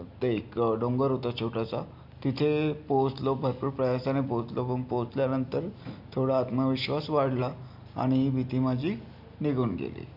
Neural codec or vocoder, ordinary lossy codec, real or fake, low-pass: none; none; real; 5.4 kHz